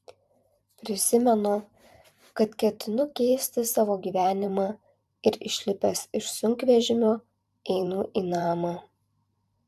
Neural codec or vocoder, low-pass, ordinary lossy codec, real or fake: vocoder, 44.1 kHz, 128 mel bands every 512 samples, BigVGAN v2; 14.4 kHz; AAC, 96 kbps; fake